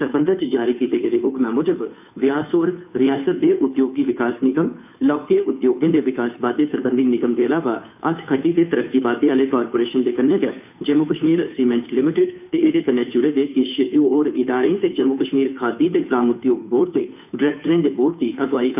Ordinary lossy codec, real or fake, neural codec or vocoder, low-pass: none; fake; codec, 16 kHz, 2 kbps, FunCodec, trained on Chinese and English, 25 frames a second; 3.6 kHz